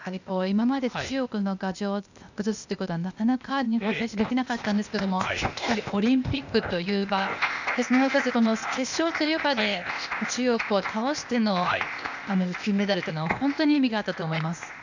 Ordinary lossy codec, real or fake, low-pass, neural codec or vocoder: none; fake; 7.2 kHz; codec, 16 kHz, 0.8 kbps, ZipCodec